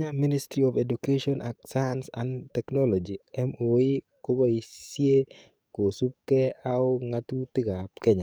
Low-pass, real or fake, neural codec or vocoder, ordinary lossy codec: none; fake; codec, 44.1 kHz, 7.8 kbps, DAC; none